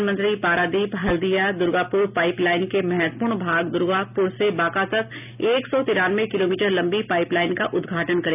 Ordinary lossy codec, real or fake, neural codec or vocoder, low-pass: none; real; none; 3.6 kHz